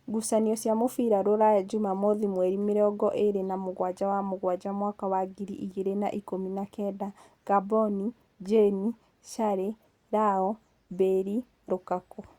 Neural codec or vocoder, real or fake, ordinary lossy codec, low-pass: none; real; Opus, 64 kbps; 19.8 kHz